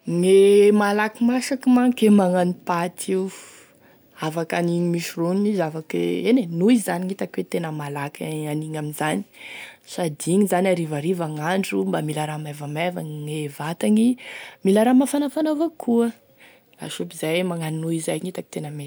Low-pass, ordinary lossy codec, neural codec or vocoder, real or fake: none; none; none; real